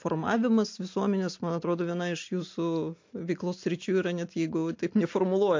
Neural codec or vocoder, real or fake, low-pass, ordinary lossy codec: none; real; 7.2 kHz; MP3, 48 kbps